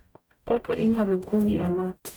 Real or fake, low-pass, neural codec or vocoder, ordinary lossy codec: fake; none; codec, 44.1 kHz, 0.9 kbps, DAC; none